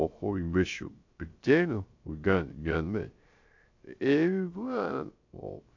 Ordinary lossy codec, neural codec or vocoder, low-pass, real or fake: AAC, 48 kbps; codec, 16 kHz, 0.3 kbps, FocalCodec; 7.2 kHz; fake